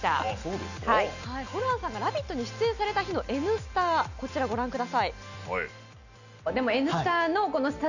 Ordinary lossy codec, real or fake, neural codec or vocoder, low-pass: none; real; none; 7.2 kHz